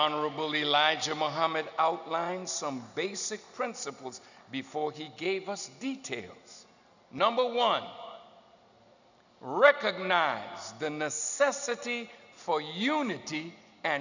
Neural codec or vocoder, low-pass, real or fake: none; 7.2 kHz; real